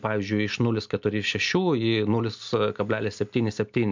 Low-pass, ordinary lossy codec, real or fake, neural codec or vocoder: 7.2 kHz; MP3, 64 kbps; real; none